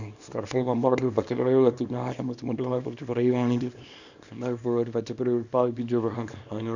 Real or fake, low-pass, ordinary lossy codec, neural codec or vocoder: fake; 7.2 kHz; none; codec, 24 kHz, 0.9 kbps, WavTokenizer, small release